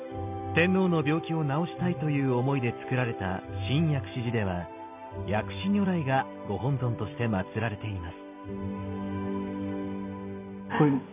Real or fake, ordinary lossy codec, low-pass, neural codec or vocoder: real; none; 3.6 kHz; none